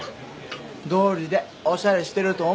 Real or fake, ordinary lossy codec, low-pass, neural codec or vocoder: real; none; none; none